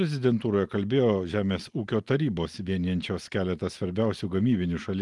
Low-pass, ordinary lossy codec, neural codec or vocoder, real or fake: 10.8 kHz; Opus, 24 kbps; none; real